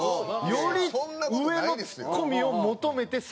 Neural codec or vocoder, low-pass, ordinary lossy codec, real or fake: none; none; none; real